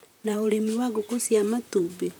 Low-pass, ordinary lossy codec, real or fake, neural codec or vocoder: none; none; fake; vocoder, 44.1 kHz, 128 mel bands, Pupu-Vocoder